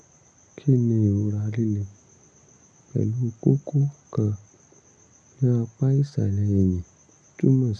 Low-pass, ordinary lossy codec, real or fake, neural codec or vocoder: 9.9 kHz; none; real; none